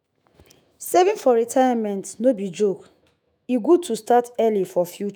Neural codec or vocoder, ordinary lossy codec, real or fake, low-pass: autoencoder, 48 kHz, 128 numbers a frame, DAC-VAE, trained on Japanese speech; none; fake; none